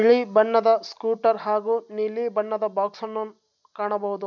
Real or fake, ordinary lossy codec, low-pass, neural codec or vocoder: real; none; 7.2 kHz; none